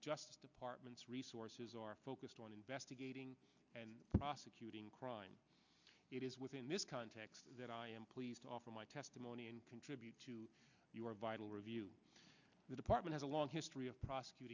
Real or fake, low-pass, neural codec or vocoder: real; 7.2 kHz; none